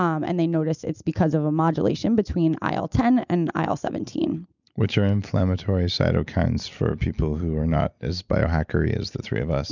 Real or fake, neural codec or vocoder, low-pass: real; none; 7.2 kHz